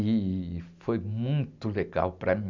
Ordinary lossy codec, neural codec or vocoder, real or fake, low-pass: none; none; real; 7.2 kHz